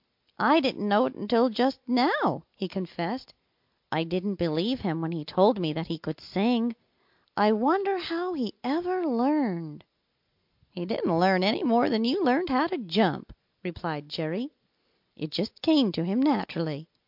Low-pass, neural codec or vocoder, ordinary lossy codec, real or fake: 5.4 kHz; none; MP3, 48 kbps; real